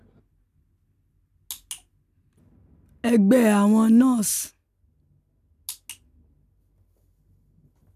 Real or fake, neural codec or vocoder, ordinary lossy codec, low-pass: real; none; none; 14.4 kHz